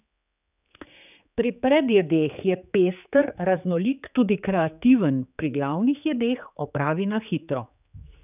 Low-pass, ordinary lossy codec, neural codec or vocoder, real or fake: 3.6 kHz; none; codec, 16 kHz, 4 kbps, X-Codec, HuBERT features, trained on general audio; fake